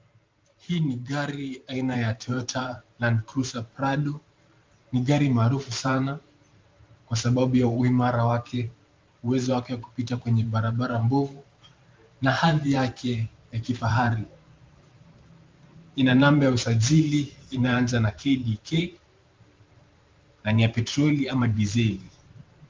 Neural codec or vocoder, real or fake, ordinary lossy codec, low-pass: vocoder, 44.1 kHz, 128 mel bands every 512 samples, BigVGAN v2; fake; Opus, 24 kbps; 7.2 kHz